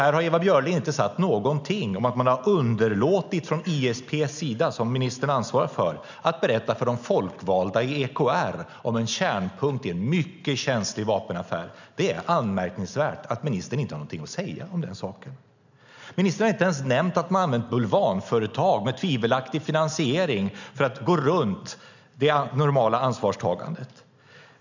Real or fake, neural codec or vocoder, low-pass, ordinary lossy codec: real; none; 7.2 kHz; none